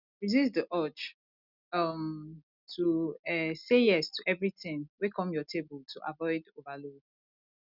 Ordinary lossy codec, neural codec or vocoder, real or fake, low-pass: none; none; real; 5.4 kHz